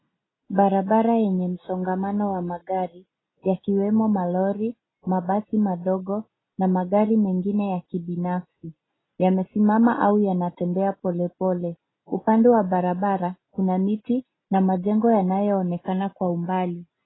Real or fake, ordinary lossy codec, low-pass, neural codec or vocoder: real; AAC, 16 kbps; 7.2 kHz; none